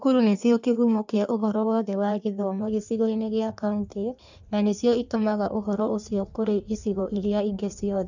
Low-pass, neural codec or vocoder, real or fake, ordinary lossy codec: 7.2 kHz; codec, 16 kHz in and 24 kHz out, 1.1 kbps, FireRedTTS-2 codec; fake; none